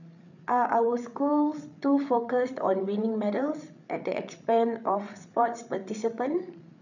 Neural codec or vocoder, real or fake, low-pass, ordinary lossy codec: codec, 16 kHz, 8 kbps, FreqCodec, larger model; fake; 7.2 kHz; none